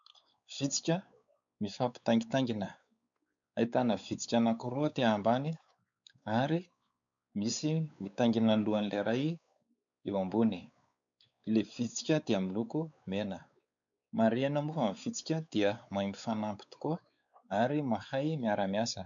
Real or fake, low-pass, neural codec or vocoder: fake; 7.2 kHz; codec, 16 kHz, 4 kbps, X-Codec, WavLM features, trained on Multilingual LibriSpeech